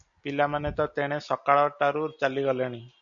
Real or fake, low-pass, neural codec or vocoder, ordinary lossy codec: real; 7.2 kHz; none; MP3, 96 kbps